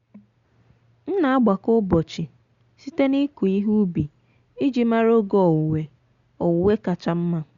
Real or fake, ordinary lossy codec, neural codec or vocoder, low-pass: real; Opus, 64 kbps; none; 7.2 kHz